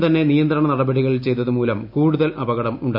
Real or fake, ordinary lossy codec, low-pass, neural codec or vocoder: real; none; 5.4 kHz; none